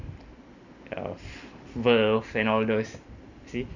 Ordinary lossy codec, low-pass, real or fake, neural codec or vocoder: none; 7.2 kHz; real; none